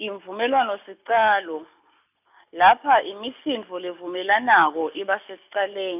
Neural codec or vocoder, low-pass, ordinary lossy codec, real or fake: none; 3.6 kHz; none; real